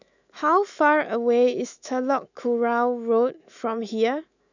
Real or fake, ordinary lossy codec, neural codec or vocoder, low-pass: fake; none; autoencoder, 48 kHz, 128 numbers a frame, DAC-VAE, trained on Japanese speech; 7.2 kHz